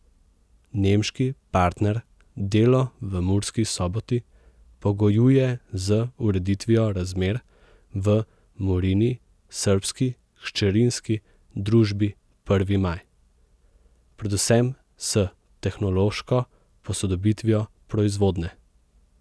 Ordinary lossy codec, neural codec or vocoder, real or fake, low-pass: none; none; real; none